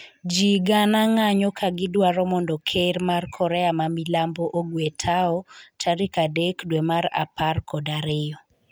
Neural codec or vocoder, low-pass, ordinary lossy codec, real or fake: none; none; none; real